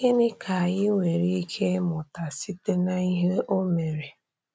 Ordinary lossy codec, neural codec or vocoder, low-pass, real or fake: none; none; none; real